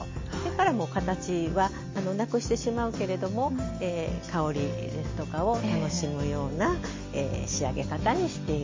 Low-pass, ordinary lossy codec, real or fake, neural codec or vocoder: 7.2 kHz; MP3, 32 kbps; real; none